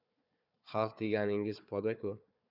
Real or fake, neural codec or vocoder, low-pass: fake; codec, 16 kHz, 4 kbps, FunCodec, trained on Chinese and English, 50 frames a second; 5.4 kHz